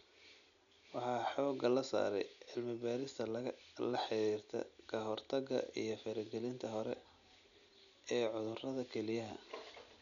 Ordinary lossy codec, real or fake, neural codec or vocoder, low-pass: none; real; none; 7.2 kHz